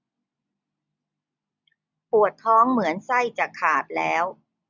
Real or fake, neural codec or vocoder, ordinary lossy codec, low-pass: real; none; none; 7.2 kHz